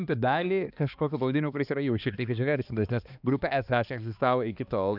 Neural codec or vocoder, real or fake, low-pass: codec, 16 kHz, 2 kbps, X-Codec, HuBERT features, trained on balanced general audio; fake; 5.4 kHz